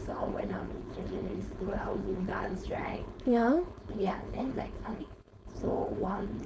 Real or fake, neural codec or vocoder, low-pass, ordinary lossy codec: fake; codec, 16 kHz, 4.8 kbps, FACodec; none; none